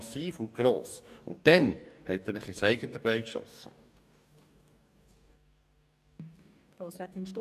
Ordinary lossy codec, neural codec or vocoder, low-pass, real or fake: none; codec, 44.1 kHz, 2.6 kbps, DAC; 14.4 kHz; fake